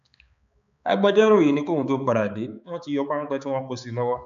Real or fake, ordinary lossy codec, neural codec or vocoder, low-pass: fake; AAC, 96 kbps; codec, 16 kHz, 4 kbps, X-Codec, HuBERT features, trained on balanced general audio; 7.2 kHz